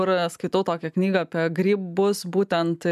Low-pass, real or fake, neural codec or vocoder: 14.4 kHz; real; none